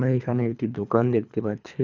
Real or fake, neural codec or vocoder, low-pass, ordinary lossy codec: fake; codec, 24 kHz, 3 kbps, HILCodec; 7.2 kHz; none